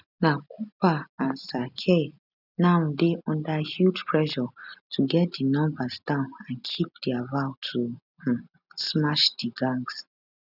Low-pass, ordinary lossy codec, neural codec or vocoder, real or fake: 5.4 kHz; none; none; real